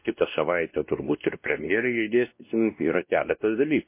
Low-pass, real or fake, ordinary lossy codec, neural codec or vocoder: 3.6 kHz; fake; MP3, 24 kbps; codec, 16 kHz, 1 kbps, X-Codec, WavLM features, trained on Multilingual LibriSpeech